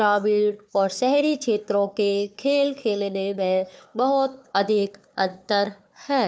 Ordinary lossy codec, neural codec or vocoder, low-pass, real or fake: none; codec, 16 kHz, 4 kbps, FunCodec, trained on Chinese and English, 50 frames a second; none; fake